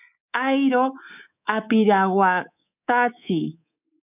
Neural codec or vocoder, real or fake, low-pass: codec, 24 kHz, 3.1 kbps, DualCodec; fake; 3.6 kHz